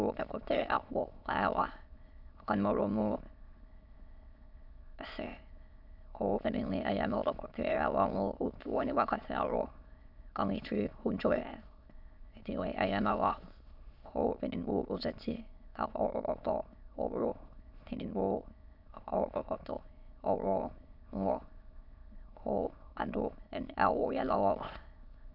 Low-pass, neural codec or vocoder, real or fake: 5.4 kHz; autoencoder, 22.05 kHz, a latent of 192 numbers a frame, VITS, trained on many speakers; fake